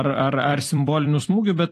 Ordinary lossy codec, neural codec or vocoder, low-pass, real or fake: AAC, 48 kbps; vocoder, 44.1 kHz, 128 mel bands every 256 samples, BigVGAN v2; 14.4 kHz; fake